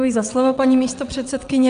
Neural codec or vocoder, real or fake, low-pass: vocoder, 22.05 kHz, 80 mel bands, WaveNeXt; fake; 9.9 kHz